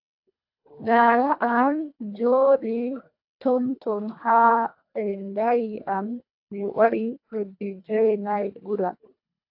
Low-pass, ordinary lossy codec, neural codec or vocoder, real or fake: 5.4 kHz; AAC, 48 kbps; codec, 24 kHz, 1.5 kbps, HILCodec; fake